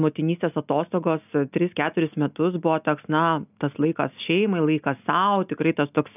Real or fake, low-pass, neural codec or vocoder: real; 3.6 kHz; none